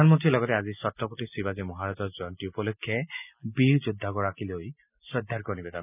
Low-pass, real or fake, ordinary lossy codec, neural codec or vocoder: 3.6 kHz; real; none; none